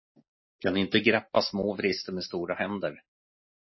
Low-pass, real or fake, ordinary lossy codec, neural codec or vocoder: 7.2 kHz; real; MP3, 24 kbps; none